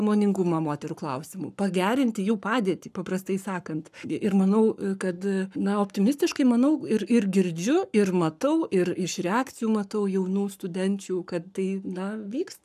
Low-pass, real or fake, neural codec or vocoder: 14.4 kHz; fake; codec, 44.1 kHz, 7.8 kbps, Pupu-Codec